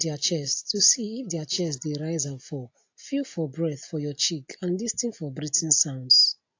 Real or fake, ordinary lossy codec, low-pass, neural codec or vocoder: real; AAC, 48 kbps; 7.2 kHz; none